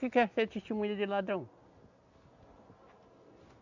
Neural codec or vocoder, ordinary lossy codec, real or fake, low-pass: none; none; real; 7.2 kHz